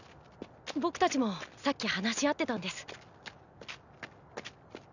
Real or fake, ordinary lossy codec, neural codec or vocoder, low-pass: real; none; none; 7.2 kHz